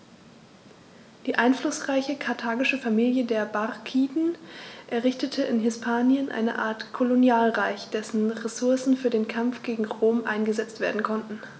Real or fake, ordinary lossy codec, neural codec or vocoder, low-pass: real; none; none; none